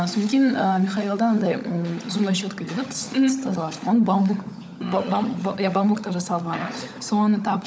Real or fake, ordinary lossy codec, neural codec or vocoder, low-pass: fake; none; codec, 16 kHz, 16 kbps, FunCodec, trained on LibriTTS, 50 frames a second; none